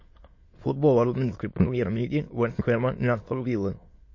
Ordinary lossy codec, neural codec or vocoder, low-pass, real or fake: MP3, 32 kbps; autoencoder, 22.05 kHz, a latent of 192 numbers a frame, VITS, trained on many speakers; 7.2 kHz; fake